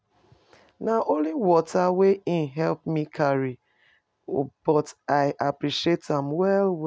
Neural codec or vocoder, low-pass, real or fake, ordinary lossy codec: none; none; real; none